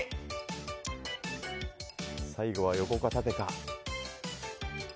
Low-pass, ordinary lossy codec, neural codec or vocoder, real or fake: none; none; none; real